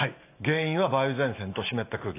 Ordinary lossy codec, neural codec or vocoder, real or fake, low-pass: AAC, 24 kbps; none; real; 3.6 kHz